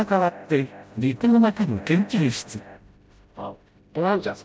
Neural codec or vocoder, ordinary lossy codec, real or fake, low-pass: codec, 16 kHz, 0.5 kbps, FreqCodec, smaller model; none; fake; none